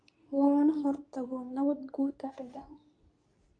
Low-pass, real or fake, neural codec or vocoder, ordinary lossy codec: 9.9 kHz; fake; codec, 24 kHz, 0.9 kbps, WavTokenizer, medium speech release version 2; AAC, 64 kbps